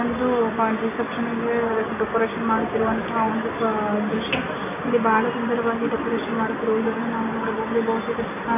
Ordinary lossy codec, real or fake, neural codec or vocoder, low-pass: AAC, 24 kbps; real; none; 3.6 kHz